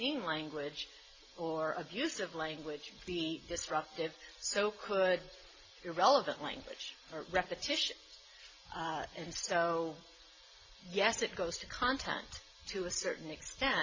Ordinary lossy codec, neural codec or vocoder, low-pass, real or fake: MP3, 32 kbps; none; 7.2 kHz; real